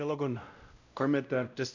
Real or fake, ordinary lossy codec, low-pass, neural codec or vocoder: fake; none; 7.2 kHz; codec, 16 kHz, 0.5 kbps, X-Codec, WavLM features, trained on Multilingual LibriSpeech